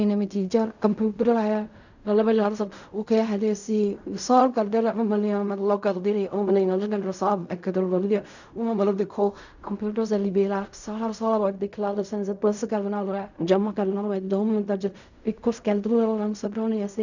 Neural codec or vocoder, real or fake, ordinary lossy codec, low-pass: codec, 16 kHz in and 24 kHz out, 0.4 kbps, LongCat-Audio-Codec, fine tuned four codebook decoder; fake; none; 7.2 kHz